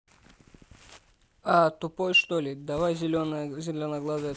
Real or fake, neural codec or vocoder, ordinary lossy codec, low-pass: real; none; none; none